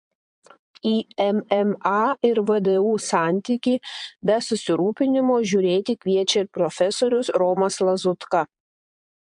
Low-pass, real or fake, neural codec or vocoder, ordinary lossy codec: 9.9 kHz; fake; vocoder, 22.05 kHz, 80 mel bands, Vocos; MP3, 64 kbps